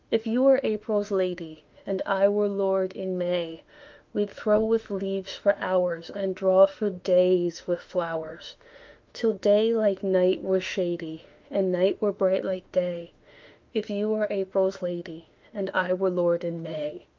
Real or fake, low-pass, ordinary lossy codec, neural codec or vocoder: fake; 7.2 kHz; Opus, 24 kbps; autoencoder, 48 kHz, 32 numbers a frame, DAC-VAE, trained on Japanese speech